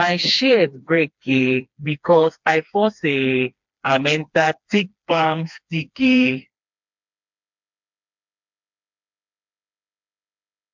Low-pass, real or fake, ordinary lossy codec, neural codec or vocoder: 7.2 kHz; fake; MP3, 64 kbps; codec, 16 kHz, 2 kbps, FreqCodec, smaller model